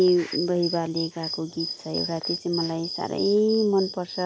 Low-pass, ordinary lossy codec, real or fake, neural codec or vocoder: none; none; real; none